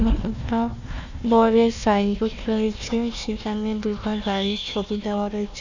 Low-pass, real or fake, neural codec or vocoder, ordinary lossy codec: 7.2 kHz; fake; codec, 16 kHz, 1 kbps, FunCodec, trained on Chinese and English, 50 frames a second; none